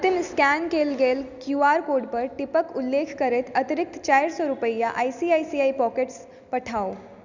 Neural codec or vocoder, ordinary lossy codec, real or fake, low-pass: none; none; real; 7.2 kHz